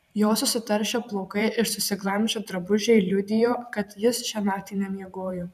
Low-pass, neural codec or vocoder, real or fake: 14.4 kHz; vocoder, 44.1 kHz, 128 mel bands every 512 samples, BigVGAN v2; fake